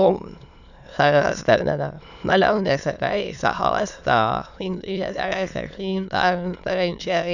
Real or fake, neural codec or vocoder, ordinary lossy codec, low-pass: fake; autoencoder, 22.05 kHz, a latent of 192 numbers a frame, VITS, trained on many speakers; none; 7.2 kHz